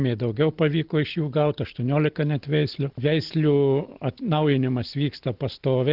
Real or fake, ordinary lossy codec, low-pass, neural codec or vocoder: real; Opus, 16 kbps; 5.4 kHz; none